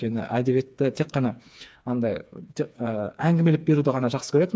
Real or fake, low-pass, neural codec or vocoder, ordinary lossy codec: fake; none; codec, 16 kHz, 4 kbps, FreqCodec, smaller model; none